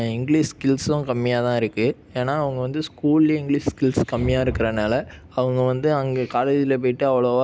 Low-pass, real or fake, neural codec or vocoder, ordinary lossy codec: none; real; none; none